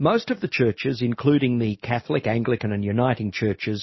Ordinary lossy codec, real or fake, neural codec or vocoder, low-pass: MP3, 24 kbps; real; none; 7.2 kHz